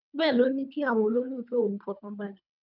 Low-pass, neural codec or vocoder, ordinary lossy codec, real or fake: 5.4 kHz; codec, 24 kHz, 3 kbps, HILCodec; none; fake